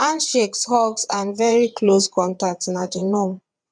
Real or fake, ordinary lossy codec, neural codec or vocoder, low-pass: fake; none; vocoder, 22.05 kHz, 80 mel bands, WaveNeXt; 9.9 kHz